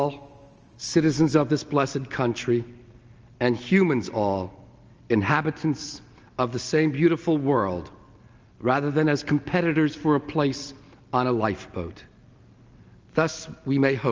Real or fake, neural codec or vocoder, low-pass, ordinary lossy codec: real; none; 7.2 kHz; Opus, 24 kbps